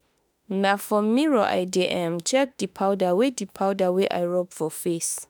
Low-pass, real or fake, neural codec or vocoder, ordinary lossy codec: none; fake; autoencoder, 48 kHz, 32 numbers a frame, DAC-VAE, trained on Japanese speech; none